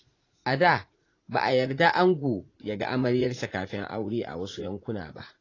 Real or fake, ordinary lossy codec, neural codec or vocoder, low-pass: fake; AAC, 32 kbps; vocoder, 44.1 kHz, 80 mel bands, Vocos; 7.2 kHz